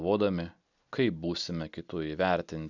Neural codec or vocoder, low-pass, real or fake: none; 7.2 kHz; real